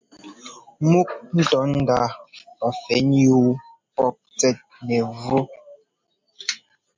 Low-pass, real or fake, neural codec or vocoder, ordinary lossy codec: 7.2 kHz; real; none; MP3, 64 kbps